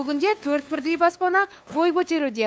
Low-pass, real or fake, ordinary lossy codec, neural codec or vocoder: none; fake; none; codec, 16 kHz, 2 kbps, FunCodec, trained on LibriTTS, 25 frames a second